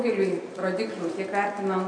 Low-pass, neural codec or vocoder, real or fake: 9.9 kHz; none; real